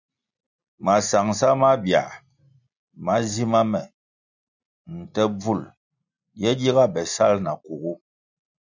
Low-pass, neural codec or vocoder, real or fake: 7.2 kHz; none; real